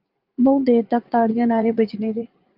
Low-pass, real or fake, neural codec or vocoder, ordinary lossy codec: 5.4 kHz; fake; vocoder, 44.1 kHz, 80 mel bands, Vocos; Opus, 24 kbps